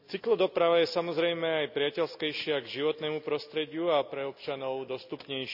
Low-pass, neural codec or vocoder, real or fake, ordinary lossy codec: 5.4 kHz; none; real; none